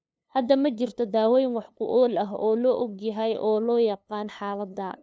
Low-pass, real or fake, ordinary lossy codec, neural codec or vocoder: none; fake; none; codec, 16 kHz, 2 kbps, FunCodec, trained on LibriTTS, 25 frames a second